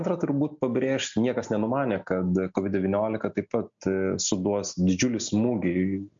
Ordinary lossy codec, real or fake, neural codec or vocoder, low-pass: MP3, 48 kbps; real; none; 7.2 kHz